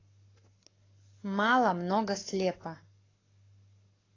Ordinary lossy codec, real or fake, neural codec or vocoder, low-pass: AAC, 32 kbps; fake; codec, 44.1 kHz, 7.8 kbps, Pupu-Codec; 7.2 kHz